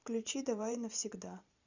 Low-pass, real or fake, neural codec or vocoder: 7.2 kHz; fake; vocoder, 44.1 kHz, 128 mel bands every 512 samples, BigVGAN v2